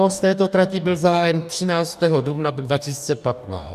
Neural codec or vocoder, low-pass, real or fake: codec, 44.1 kHz, 2.6 kbps, DAC; 14.4 kHz; fake